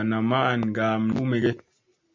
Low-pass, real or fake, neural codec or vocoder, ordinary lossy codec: 7.2 kHz; real; none; MP3, 64 kbps